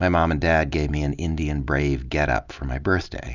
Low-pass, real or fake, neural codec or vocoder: 7.2 kHz; real; none